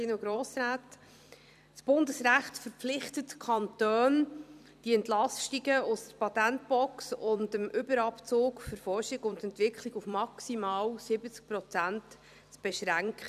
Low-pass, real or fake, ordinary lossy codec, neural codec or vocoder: 14.4 kHz; fake; none; vocoder, 44.1 kHz, 128 mel bands every 256 samples, BigVGAN v2